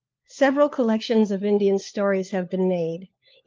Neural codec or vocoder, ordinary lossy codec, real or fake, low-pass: codec, 16 kHz, 4 kbps, FunCodec, trained on LibriTTS, 50 frames a second; Opus, 32 kbps; fake; 7.2 kHz